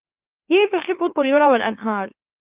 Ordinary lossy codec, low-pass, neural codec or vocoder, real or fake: Opus, 24 kbps; 3.6 kHz; autoencoder, 44.1 kHz, a latent of 192 numbers a frame, MeloTTS; fake